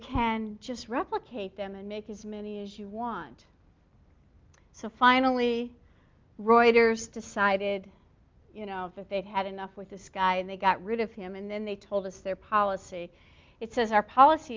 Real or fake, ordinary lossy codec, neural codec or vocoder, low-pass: real; Opus, 24 kbps; none; 7.2 kHz